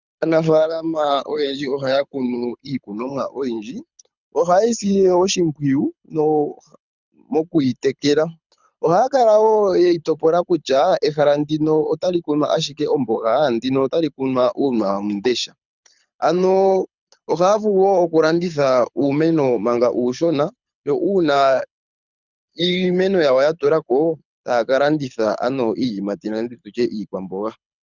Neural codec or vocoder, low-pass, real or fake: codec, 24 kHz, 6 kbps, HILCodec; 7.2 kHz; fake